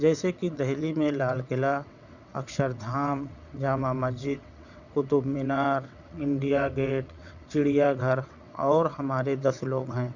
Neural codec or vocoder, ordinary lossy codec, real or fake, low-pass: vocoder, 22.05 kHz, 80 mel bands, WaveNeXt; none; fake; 7.2 kHz